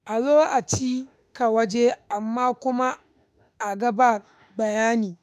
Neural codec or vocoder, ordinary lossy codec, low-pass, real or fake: autoencoder, 48 kHz, 32 numbers a frame, DAC-VAE, trained on Japanese speech; none; 14.4 kHz; fake